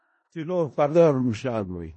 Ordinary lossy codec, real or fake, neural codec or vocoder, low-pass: MP3, 32 kbps; fake; codec, 16 kHz in and 24 kHz out, 0.4 kbps, LongCat-Audio-Codec, four codebook decoder; 10.8 kHz